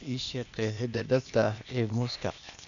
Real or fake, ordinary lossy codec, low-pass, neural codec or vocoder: fake; none; 7.2 kHz; codec, 16 kHz, 0.8 kbps, ZipCodec